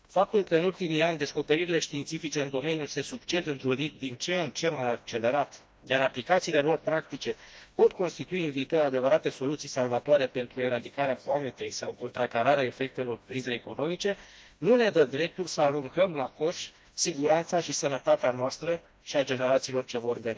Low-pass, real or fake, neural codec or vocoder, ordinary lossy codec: none; fake; codec, 16 kHz, 1 kbps, FreqCodec, smaller model; none